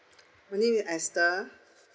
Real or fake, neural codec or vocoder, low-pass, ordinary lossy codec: real; none; none; none